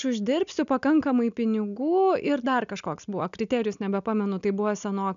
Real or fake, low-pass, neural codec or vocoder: real; 7.2 kHz; none